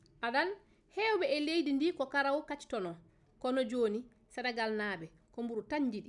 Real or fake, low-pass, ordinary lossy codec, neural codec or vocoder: real; 10.8 kHz; none; none